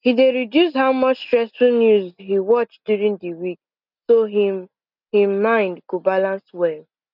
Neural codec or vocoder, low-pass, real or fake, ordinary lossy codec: none; 5.4 kHz; real; none